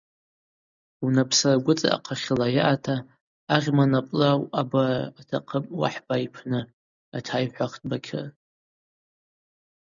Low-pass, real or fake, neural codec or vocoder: 7.2 kHz; real; none